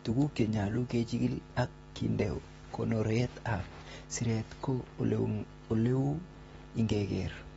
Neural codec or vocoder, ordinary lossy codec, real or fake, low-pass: none; AAC, 24 kbps; real; 19.8 kHz